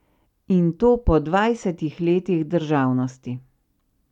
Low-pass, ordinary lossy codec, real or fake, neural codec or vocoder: 19.8 kHz; none; real; none